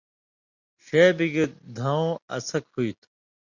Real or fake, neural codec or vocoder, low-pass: real; none; 7.2 kHz